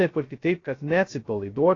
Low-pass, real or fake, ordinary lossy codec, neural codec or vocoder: 7.2 kHz; fake; AAC, 32 kbps; codec, 16 kHz, 0.2 kbps, FocalCodec